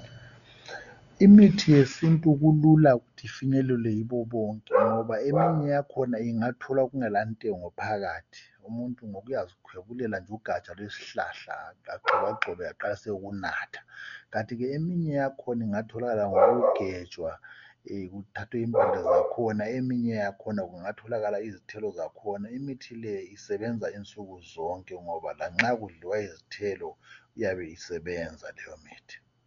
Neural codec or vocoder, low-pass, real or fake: none; 7.2 kHz; real